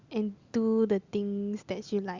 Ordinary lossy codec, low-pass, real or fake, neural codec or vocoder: Opus, 64 kbps; 7.2 kHz; real; none